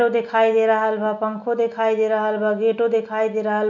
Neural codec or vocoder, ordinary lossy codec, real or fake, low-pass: none; none; real; 7.2 kHz